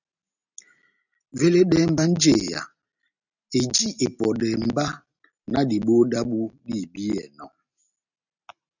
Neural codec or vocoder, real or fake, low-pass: none; real; 7.2 kHz